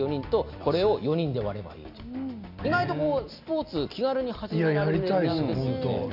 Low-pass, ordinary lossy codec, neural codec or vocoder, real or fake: 5.4 kHz; none; none; real